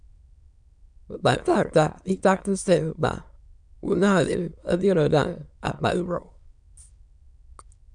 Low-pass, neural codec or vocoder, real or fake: 9.9 kHz; autoencoder, 22.05 kHz, a latent of 192 numbers a frame, VITS, trained on many speakers; fake